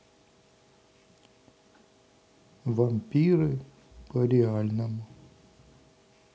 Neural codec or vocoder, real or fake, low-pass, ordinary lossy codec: none; real; none; none